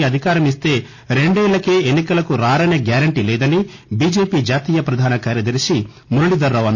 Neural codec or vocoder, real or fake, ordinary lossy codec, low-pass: none; real; none; none